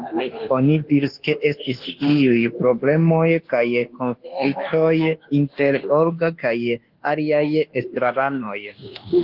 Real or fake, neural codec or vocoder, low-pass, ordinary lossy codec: fake; codec, 24 kHz, 1.2 kbps, DualCodec; 5.4 kHz; Opus, 16 kbps